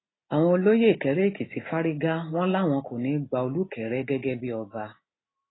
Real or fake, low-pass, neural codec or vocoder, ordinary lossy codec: real; 7.2 kHz; none; AAC, 16 kbps